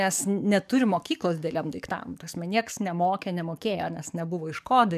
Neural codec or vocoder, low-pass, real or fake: codec, 44.1 kHz, 7.8 kbps, DAC; 14.4 kHz; fake